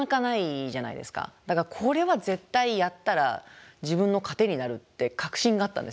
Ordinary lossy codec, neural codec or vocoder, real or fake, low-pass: none; none; real; none